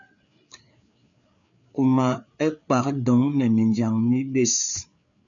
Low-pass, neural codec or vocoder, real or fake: 7.2 kHz; codec, 16 kHz, 4 kbps, FreqCodec, larger model; fake